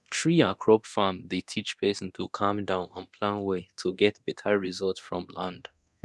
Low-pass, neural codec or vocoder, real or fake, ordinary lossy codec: none; codec, 24 kHz, 0.9 kbps, DualCodec; fake; none